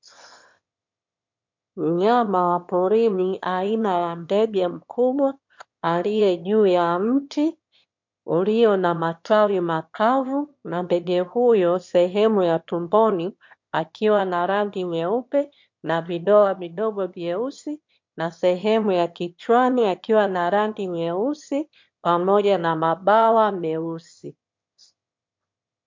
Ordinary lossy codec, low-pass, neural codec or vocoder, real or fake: MP3, 48 kbps; 7.2 kHz; autoencoder, 22.05 kHz, a latent of 192 numbers a frame, VITS, trained on one speaker; fake